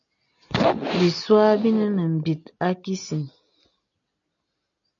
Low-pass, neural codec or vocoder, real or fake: 7.2 kHz; none; real